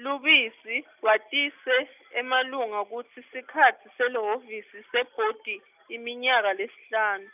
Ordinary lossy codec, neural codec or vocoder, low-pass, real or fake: none; none; 3.6 kHz; real